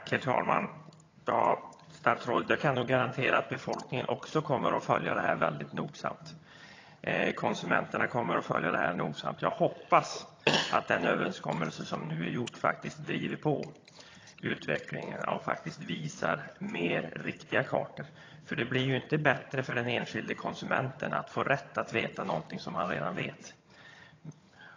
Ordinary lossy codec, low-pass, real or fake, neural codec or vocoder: AAC, 32 kbps; 7.2 kHz; fake; vocoder, 22.05 kHz, 80 mel bands, HiFi-GAN